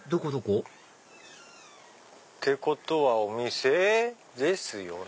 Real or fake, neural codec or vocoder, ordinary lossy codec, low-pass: real; none; none; none